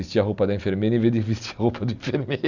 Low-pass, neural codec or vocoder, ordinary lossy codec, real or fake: 7.2 kHz; none; none; real